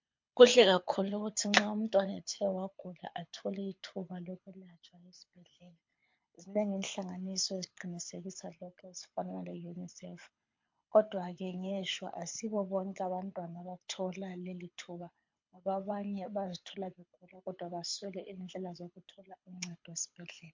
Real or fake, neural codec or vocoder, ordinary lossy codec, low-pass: fake; codec, 24 kHz, 6 kbps, HILCodec; MP3, 48 kbps; 7.2 kHz